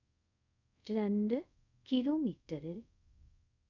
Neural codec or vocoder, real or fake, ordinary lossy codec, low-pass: codec, 24 kHz, 0.5 kbps, DualCodec; fake; none; 7.2 kHz